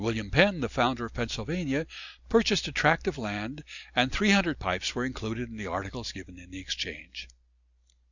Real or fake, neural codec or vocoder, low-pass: real; none; 7.2 kHz